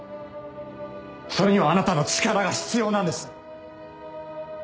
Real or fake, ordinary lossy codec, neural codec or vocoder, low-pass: real; none; none; none